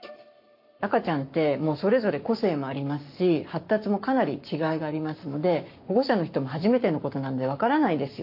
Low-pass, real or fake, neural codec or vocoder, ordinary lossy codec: 5.4 kHz; fake; codec, 44.1 kHz, 7.8 kbps, Pupu-Codec; MP3, 32 kbps